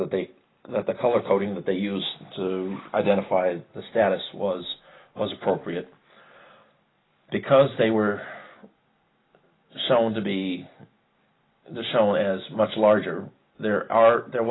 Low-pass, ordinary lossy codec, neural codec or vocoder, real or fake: 7.2 kHz; AAC, 16 kbps; none; real